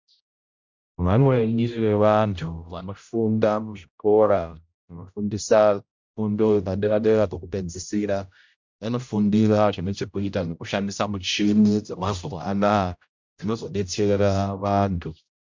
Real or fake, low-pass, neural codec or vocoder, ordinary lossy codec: fake; 7.2 kHz; codec, 16 kHz, 0.5 kbps, X-Codec, HuBERT features, trained on general audio; MP3, 64 kbps